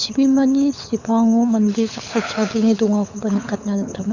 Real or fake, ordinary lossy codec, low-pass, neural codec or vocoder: fake; none; 7.2 kHz; codec, 24 kHz, 6 kbps, HILCodec